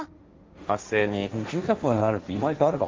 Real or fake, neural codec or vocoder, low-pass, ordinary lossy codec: fake; codec, 16 kHz, 1.1 kbps, Voila-Tokenizer; 7.2 kHz; Opus, 32 kbps